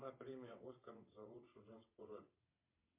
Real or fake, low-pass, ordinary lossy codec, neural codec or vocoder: fake; 3.6 kHz; MP3, 24 kbps; vocoder, 22.05 kHz, 80 mel bands, WaveNeXt